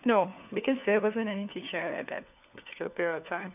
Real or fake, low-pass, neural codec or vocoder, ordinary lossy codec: fake; 3.6 kHz; codec, 16 kHz, 4 kbps, FunCodec, trained on LibriTTS, 50 frames a second; none